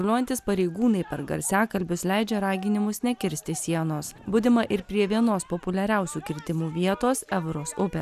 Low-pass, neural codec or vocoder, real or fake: 14.4 kHz; none; real